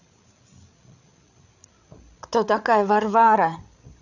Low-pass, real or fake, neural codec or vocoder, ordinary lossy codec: 7.2 kHz; fake; codec, 16 kHz, 8 kbps, FreqCodec, larger model; none